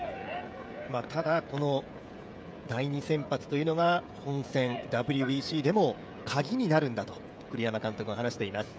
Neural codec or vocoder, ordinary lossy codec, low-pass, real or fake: codec, 16 kHz, 16 kbps, FreqCodec, smaller model; none; none; fake